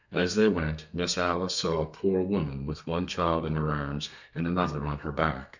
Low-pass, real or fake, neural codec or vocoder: 7.2 kHz; fake; codec, 32 kHz, 1.9 kbps, SNAC